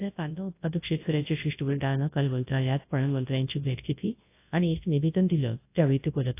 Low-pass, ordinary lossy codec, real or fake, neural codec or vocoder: 3.6 kHz; AAC, 24 kbps; fake; codec, 24 kHz, 0.9 kbps, WavTokenizer, large speech release